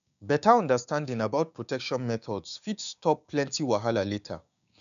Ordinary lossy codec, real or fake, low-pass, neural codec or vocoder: none; fake; 7.2 kHz; codec, 16 kHz, 6 kbps, DAC